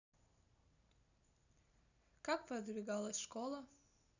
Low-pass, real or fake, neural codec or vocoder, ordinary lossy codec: 7.2 kHz; real; none; none